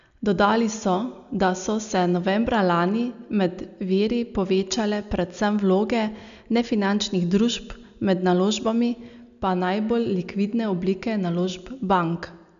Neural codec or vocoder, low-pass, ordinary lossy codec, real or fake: none; 7.2 kHz; none; real